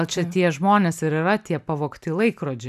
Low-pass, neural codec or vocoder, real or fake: 14.4 kHz; none; real